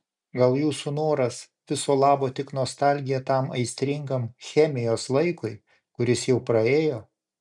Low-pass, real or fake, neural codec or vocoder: 10.8 kHz; real; none